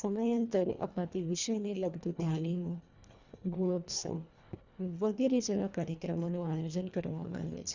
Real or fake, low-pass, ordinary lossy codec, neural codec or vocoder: fake; 7.2 kHz; Opus, 64 kbps; codec, 24 kHz, 1.5 kbps, HILCodec